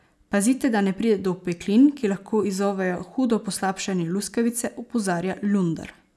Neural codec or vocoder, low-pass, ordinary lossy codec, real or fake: none; none; none; real